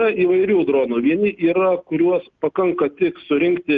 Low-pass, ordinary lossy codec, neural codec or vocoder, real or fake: 7.2 kHz; Opus, 24 kbps; none; real